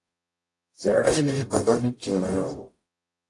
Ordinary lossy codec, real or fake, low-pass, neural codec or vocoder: AAC, 48 kbps; fake; 10.8 kHz; codec, 44.1 kHz, 0.9 kbps, DAC